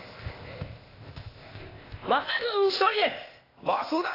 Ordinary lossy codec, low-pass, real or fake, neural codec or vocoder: AAC, 24 kbps; 5.4 kHz; fake; codec, 16 kHz, 0.8 kbps, ZipCodec